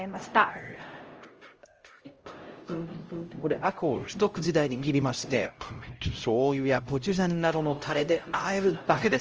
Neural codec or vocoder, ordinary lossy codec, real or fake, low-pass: codec, 16 kHz, 0.5 kbps, X-Codec, HuBERT features, trained on LibriSpeech; Opus, 24 kbps; fake; 7.2 kHz